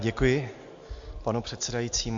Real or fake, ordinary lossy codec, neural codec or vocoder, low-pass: real; MP3, 48 kbps; none; 7.2 kHz